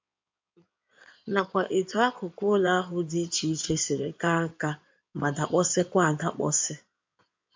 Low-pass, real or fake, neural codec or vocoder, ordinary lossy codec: 7.2 kHz; fake; codec, 16 kHz in and 24 kHz out, 2.2 kbps, FireRedTTS-2 codec; MP3, 64 kbps